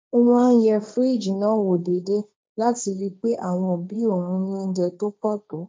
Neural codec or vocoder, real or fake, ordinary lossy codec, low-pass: codec, 16 kHz, 1.1 kbps, Voila-Tokenizer; fake; none; none